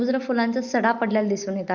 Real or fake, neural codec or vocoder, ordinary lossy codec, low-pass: real; none; Opus, 64 kbps; 7.2 kHz